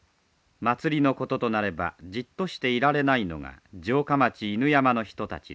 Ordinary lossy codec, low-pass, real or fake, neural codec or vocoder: none; none; real; none